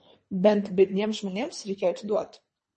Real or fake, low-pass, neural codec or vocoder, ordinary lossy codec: fake; 10.8 kHz; codec, 24 kHz, 3 kbps, HILCodec; MP3, 32 kbps